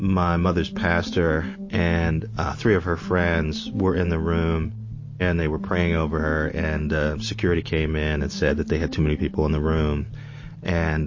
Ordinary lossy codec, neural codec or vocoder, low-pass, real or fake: MP3, 32 kbps; none; 7.2 kHz; real